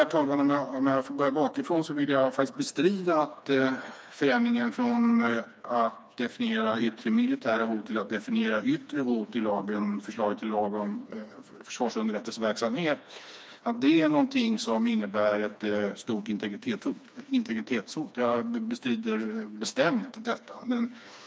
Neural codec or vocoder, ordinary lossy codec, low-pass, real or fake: codec, 16 kHz, 2 kbps, FreqCodec, smaller model; none; none; fake